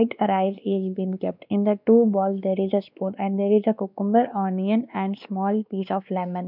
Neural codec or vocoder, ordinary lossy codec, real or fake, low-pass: codec, 16 kHz, 2 kbps, X-Codec, WavLM features, trained on Multilingual LibriSpeech; none; fake; 5.4 kHz